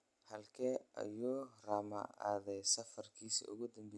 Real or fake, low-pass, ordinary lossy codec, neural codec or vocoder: real; none; none; none